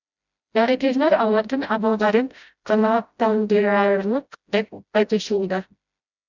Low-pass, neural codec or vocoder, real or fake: 7.2 kHz; codec, 16 kHz, 0.5 kbps, FreqCodec, smaller model; fake